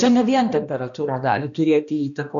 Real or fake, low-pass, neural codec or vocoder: fake; 7.2 kHz; codec, 16 kHz, 1 kbps, X-Codec, HuBERT features, trained on balanced general audio